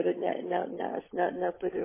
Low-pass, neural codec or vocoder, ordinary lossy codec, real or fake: 3.6 kHz; codec, 16 kHz, 16 kbps, FunCodec, trained on LibriTTS, 50 frames a second; MP3, 16 kbps; fake